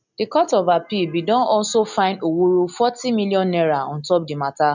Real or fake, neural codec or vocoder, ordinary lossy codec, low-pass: real; none; none; 7.2 kHz